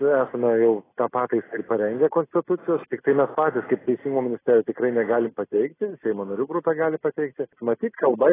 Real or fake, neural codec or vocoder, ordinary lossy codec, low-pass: real; none; AAC, 16 kbps; 3.6 kHz